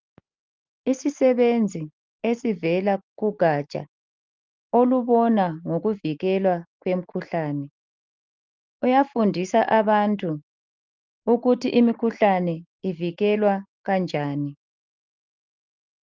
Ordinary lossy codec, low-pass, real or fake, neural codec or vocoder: Opus, 32 kbps; 7.2 kHz; real; none